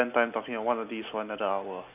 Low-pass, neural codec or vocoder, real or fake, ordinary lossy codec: 3.6 kHz; none; real; none